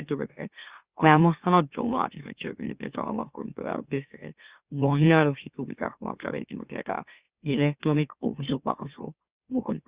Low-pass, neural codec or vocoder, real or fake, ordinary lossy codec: 3.6 kHz; autoencoder, 44.1 kHz, a latent of 192 numbers a frame, MeloTTS; fake; Opus, 64 kbps